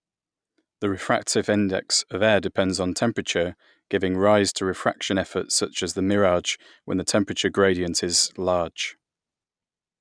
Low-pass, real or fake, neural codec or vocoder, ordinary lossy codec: 9.9 kHz; real; none; none